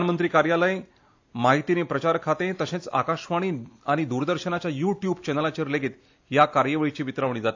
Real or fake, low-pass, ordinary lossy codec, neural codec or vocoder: real; 7.2 kHz; AAC, 48 kbps; none